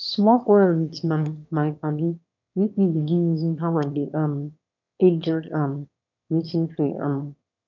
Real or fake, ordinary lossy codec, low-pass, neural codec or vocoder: fake; none; 7.2 kHz; autoencoder, 22.05 kHz, a latent of 192 numbers a frame, VITS, trained on one speaker